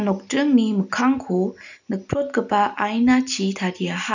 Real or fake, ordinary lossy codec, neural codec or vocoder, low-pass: real; none; none; 7.2 kHz